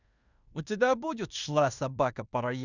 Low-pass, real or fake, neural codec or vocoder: 7.2 kHz; fake; codec, 16 kHz in and 24 kHz out, 0.9 kbps, LongCat-Audio-Codec, fine tuned four codebook decoder